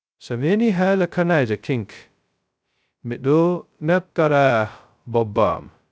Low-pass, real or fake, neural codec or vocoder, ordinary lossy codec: none; fake; codec, 16 kHz, 0.2 kbps, FocalCodec; none